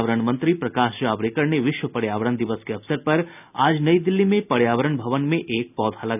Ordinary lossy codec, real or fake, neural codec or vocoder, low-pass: none; real; none; 3.6 kHz